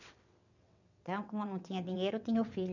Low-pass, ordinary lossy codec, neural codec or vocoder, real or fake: 7.2 kHz; none; vocoder, 22.05 kHz, 80 mel bands, WaveNeXt; fake